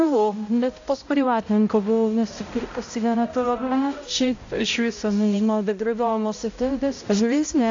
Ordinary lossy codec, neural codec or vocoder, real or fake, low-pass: AAC, 48 kbps; codec, 16 kHz, 0.5 kbps, X-Codec, HuBERT features, trained on balanced general audio; fake; 7.2 kHz